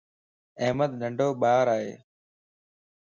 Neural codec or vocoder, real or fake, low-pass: none; real; 7.2 kHz